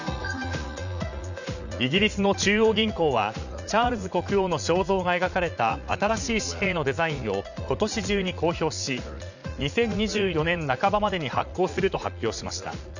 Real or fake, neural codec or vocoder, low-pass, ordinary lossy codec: fake; vocoder, 44.1 kHz, 80 mel bands, Vocos; 7.2 kHz; none